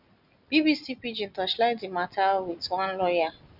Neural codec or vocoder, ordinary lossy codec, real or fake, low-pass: none; none; real; 5.4 kHz